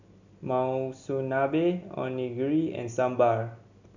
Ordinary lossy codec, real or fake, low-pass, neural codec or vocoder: none; real; 7.2 kHz; none